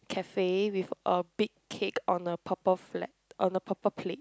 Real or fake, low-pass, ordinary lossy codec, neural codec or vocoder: real; none; none; none